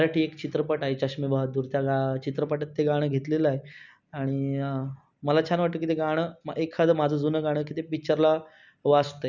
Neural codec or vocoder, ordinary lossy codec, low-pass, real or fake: none; none; 7.2 kHz; real